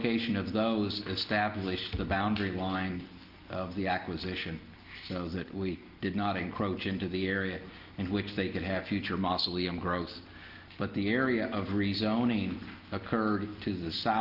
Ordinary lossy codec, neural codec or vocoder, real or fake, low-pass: Opus, 32 kbps; none; real; 5.4 kHz